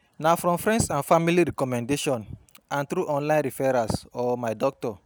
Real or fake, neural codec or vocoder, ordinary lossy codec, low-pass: real; none; none; none